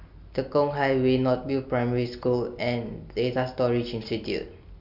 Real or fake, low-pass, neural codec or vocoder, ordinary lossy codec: real; 5.4 kHz; none; AAC, 48 kbps